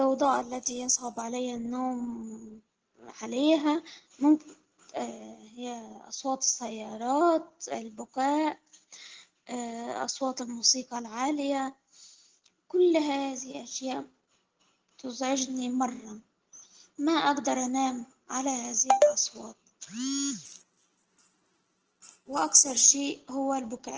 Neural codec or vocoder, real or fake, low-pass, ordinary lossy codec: none; real; 7.2 kHz; Opus, 16 kbps